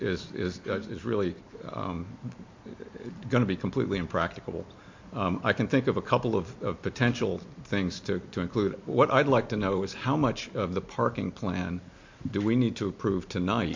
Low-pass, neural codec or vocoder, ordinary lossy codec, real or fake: 7.2 kHz; none; MP3, 48 kbps; real